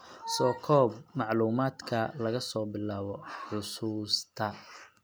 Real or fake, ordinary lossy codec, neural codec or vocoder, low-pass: real; none; none; none